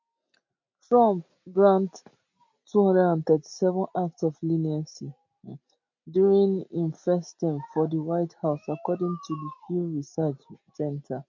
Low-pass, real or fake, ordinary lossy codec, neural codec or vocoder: 7.2 kHz; real; MP3, 48 kbps; none